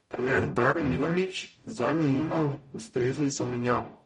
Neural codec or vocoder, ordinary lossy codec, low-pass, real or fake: codec, 44.1 kHz, 0.9 kbps, DAC; MP3, 48 kbps; 19.8 kHz; fake